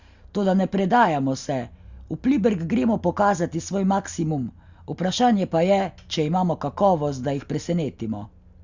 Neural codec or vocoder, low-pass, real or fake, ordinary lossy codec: none; 7.2 kHz; real; Opus, 64 kbps